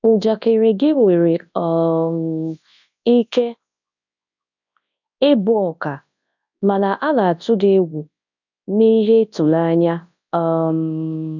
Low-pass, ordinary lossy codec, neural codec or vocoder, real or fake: 7.2 kHz; none; codec, 24 kHz, 0.9 kbps, WavTokenizer, large speech release; fake